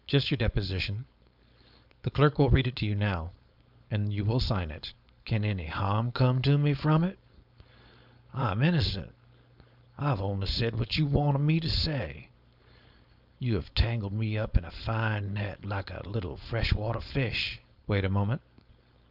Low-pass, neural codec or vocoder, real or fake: 5.4 kHz; codec, 16 kHz, 4.8 kbps, FACodec; fake